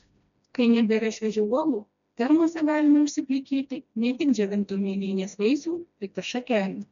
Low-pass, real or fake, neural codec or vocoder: 7.2 kHz; fake; codec, 16 kHz, 1 kbps, FreqCodec, smaller model